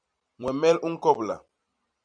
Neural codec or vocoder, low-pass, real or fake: vocoder, 44.1 kHz, 128 mel bands every 256 samples, BigVGAN v2; 9.9 kHz; fake